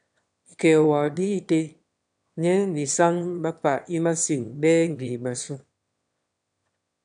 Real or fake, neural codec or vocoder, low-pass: fake; autoencoder, 22.05 kHz, a latent of 192 numbers a frame, VITS, trained on one speaker; 9.9 kHz